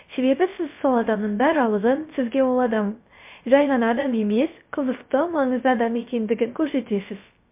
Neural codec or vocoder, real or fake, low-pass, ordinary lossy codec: codec, 16 kHz, 0.3 kbps, FocalCodec; fake; 3.6 kHz; MP3, 32 kbps